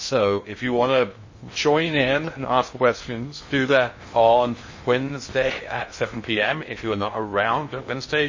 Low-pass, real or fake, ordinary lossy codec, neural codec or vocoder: 7.2 kHz; fake; MP3, 32 kbps; codec, 16 kHz in and 24 kHz out, 0.6 kbps, FocalCodec, streaming, 2048 codes